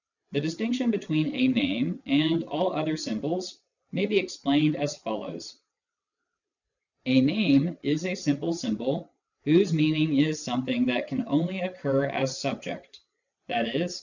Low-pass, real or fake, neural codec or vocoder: 7.2 kHz; fake; vocoder, 22.05 kHz, 80 mel bands, WaveNeXt